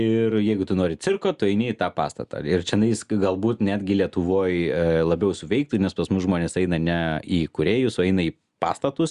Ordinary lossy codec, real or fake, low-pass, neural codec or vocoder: Opus, 64 kbps; real; 10.8 kHz; none